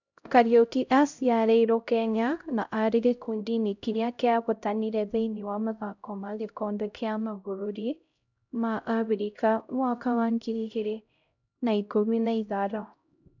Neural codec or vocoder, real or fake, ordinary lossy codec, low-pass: codec, 16 kHz, 0.5 kbps, X-Codec, HuBERT features, trained on LibriSpeech; fake; none; 7.2 kHz